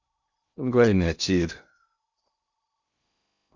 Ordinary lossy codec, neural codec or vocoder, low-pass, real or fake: Opus, 64 kbps; codec, 16 kHz in and 24 kHz out, 0.6 kbps, FocalCodec, streaming, 2048 codes; 7.2 kHz; fake